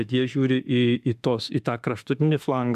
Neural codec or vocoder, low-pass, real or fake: autoencoder, 48 kHz, 32 numbers a frame, DAC-VAE, trained on Japanese speech; 14.4 kHz; fake